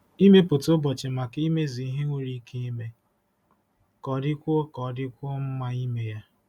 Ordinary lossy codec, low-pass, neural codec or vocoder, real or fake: none; 19.8 kHz; none; real